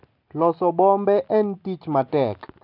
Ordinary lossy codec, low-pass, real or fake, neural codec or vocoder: none; 5.4 kHz; real; none